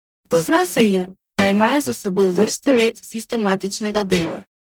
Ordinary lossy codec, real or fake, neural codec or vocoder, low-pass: none; fake; codec, 44.1 kHz, 0.9 kbps, DAC; none